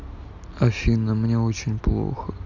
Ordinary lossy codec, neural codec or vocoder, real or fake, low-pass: none; none; real; 7.2 kHz